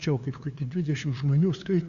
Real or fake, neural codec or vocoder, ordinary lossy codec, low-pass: fake; codec, 16 kHz, 2 kbps, FunCodec, trained on LibriTTS, 25 frames a second; Opus, 64 kbps; 7.2 kHz